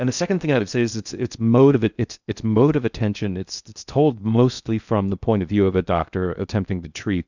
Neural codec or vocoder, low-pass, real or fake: codec, 16 kHz in and 24 kHz out, 0.6 kbps, FocalCodec, streaming, 2048 codes; 7.2 kHz; fake